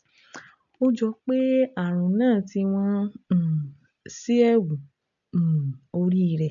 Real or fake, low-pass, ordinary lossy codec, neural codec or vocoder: real; 7.2 kHz; none; none